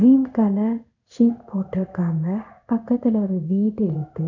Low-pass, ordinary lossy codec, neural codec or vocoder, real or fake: 7.2 kHz; none; codec, 16 kHz in and 24 kHz out, 1 kbps, XY-Tokenizer; fake